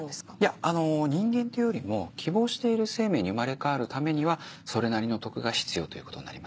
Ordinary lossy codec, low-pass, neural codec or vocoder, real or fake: none; none; none; real